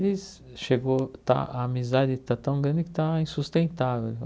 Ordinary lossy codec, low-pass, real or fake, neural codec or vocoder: none; none; real; none